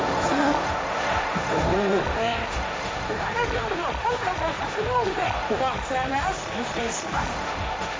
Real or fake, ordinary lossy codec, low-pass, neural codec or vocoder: fake; none; none; codec, 16 kHz, 1.1 kbps, Voila-Tokenizer